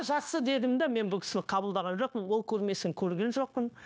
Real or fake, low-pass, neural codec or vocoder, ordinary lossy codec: fake; none; codec, 16 kHz, 0.9 kbps, LongCat-Audio-Codec; none